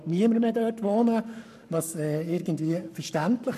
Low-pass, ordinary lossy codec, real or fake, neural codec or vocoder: 14.4 kHz; none; fake; codec, 44.1 kHz, 7.8 kbps, Pupu-Codec